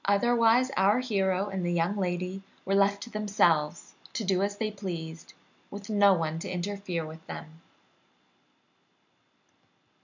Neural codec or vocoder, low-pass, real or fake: none; 7.2 kHz; real